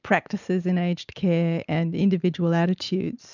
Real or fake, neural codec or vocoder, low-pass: real; none; 7.2 kHz